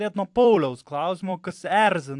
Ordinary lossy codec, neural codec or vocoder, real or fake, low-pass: AAC, 64 kbps; vocoder, 44.1 kHz, 128 mel bands every 256 samples, BigVGAN v2; fake; 10.8 kHz